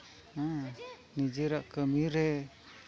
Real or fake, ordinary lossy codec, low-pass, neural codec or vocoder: real; none; none; none